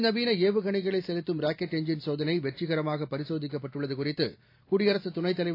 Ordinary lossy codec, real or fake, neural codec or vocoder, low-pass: AAC, 32 kbps; real; none; 5.4 kHz